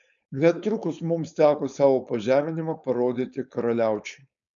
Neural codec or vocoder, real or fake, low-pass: codec, 16 kHz, 4.8 kbps, FACodec; fake; 7.2 kHz